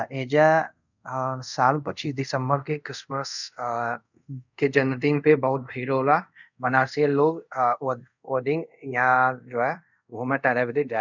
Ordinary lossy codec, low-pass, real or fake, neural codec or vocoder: none; 7.2 kHz; fake; codec, 24 kHz, 0.5 kbps, DualCodec